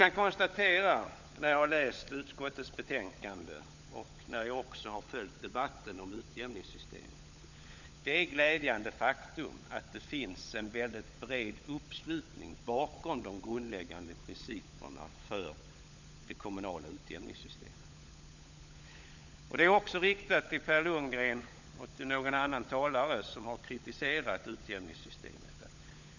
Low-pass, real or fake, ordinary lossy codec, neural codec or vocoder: 7.2 kHz; fake; none; codec, 16 kHz, 16 kbps, FunCodec, trained on LibriTTS, 50 frames a second